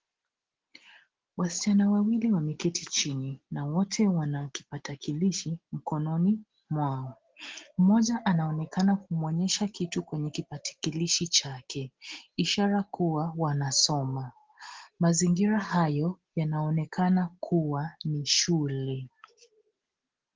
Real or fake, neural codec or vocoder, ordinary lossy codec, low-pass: real; none; Opus, 16 kbps; 7.2 kHz